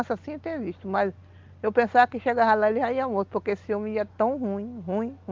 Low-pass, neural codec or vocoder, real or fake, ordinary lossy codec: 7.2 kHz; none; real; Opus, 32 kbps